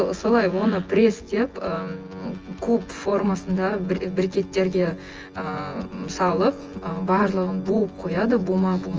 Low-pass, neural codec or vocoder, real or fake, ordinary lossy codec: 7.2 kHz; vocoder, 24 kHz, 100 mel bands, Vocos; fake; Opus, 24 kbps